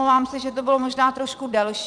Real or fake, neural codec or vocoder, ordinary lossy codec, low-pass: fake; vocoder, 22.05 kHz, 80 mel bands, Vocos; MP3, 96 kbps; 9.9 kHz